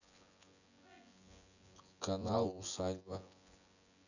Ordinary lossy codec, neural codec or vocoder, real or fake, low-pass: none; vocoder, 24 kHz, 100 mel bands, Vocos; fake; 7.2 kHz